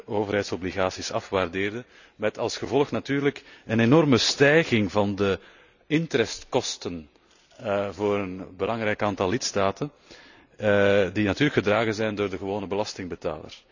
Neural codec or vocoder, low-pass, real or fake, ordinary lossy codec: none; 7.2 kHz; real; none